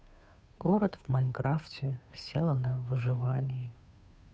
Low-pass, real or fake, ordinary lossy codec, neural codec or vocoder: none; fake; none; codec, 16 kHz, 2 kbps, FunCodec, trained on Chinese and English, 25 frames a second